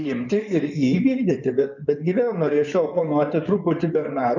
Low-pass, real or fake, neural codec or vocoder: 7.2 kHz; fake; codec, 16 kHz in and 24 kHz out, 2.2 kbps, FireRedTTS-2 codec